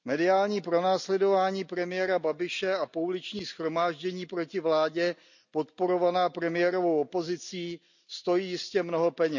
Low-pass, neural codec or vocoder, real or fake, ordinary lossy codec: 7.2 kHz; none; real; none